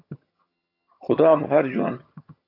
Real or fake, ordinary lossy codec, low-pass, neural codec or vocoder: fake; AAC, 32 kbps; 5.4 kHz; vocoder, 22.05 kHz, 80 mel bands, HiFi-GAN